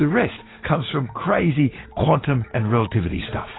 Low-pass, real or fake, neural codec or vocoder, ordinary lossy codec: 7.2 kHz; fake; codec, 16 kHz, 6 kbps, DAC; AAC, 16 kbps